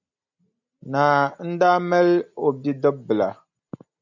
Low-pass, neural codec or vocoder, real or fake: 7.2 kHz; none; real